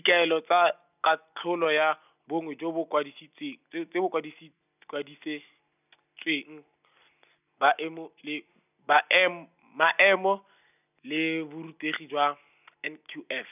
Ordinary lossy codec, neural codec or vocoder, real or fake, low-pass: none; none; real; 3.6 kHz